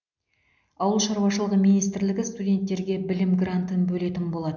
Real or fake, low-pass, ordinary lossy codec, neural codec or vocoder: real; 7.2 kHz; none; none